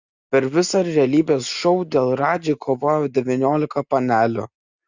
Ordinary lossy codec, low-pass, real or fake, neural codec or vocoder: Opus, 64 kbps; 7.2 kHz; real; none